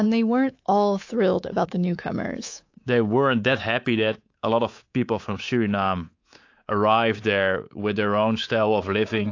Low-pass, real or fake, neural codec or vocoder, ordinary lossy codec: 7.2 kHz; fake; codec, 24 kHz, 3.1 kbps, DualCodec; AAC, 48 kbps